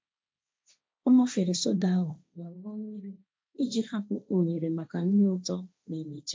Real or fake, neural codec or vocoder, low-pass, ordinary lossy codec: fake; codec, 16 kHz, 1.1 kbps, Voila-Tokenizer; none; none